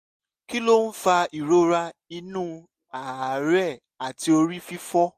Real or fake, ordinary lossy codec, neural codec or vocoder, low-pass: real; MP3, 64 kbps; none; 14.4 kHz